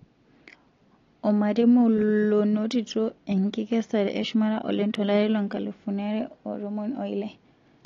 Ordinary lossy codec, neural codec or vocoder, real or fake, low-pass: AAC, 32 kbps; none; real; 7.2 kHz